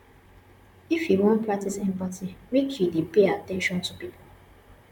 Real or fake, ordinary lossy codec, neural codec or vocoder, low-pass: fake; none; vocoder, 44.1 kHz, 128 mel bands, Pupu-Vocoder; 19.8 kHz